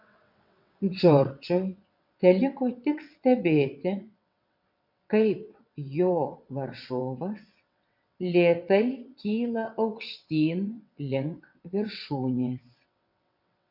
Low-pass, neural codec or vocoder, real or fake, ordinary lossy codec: 5.4 kHz; vocoder, 22.05 kHz, 80 mel bands, WaveNeXt; fake; MP3, 48 kbps